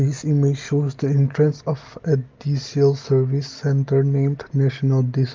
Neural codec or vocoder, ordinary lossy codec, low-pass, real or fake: none; Opus, 32 kbps; 7.2 kHz; real